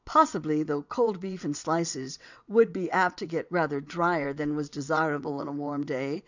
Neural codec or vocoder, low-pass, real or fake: vocoder, 22.05 kHz, 80 mel bands, WaveNeXt; 7.2 kHz; fake